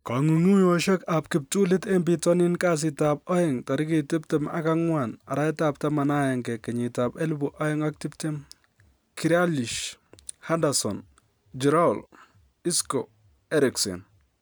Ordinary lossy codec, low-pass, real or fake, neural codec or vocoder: none; none; real; none